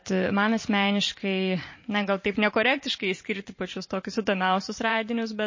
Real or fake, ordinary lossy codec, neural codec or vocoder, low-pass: fake; MP3, 32 kbps; vocoder, 44.1 kHz, 128 mel bands every 512 samples, BigVGAN v2; 7.2 kHz